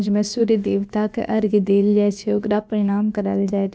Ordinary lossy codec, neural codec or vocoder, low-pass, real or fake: none; codec, 16 kHz, about 1 kbps, DyCAST, with the encoder's durations; none; fake